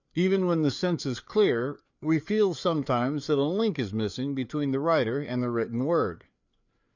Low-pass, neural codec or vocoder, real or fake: 7.2 kHz; codec, 16 kHz, 4 kbps, FreqCodec, larger model; fake